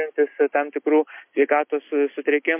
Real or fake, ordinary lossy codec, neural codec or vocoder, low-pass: real; MP3, 24 kbps; none; 3.6 kHz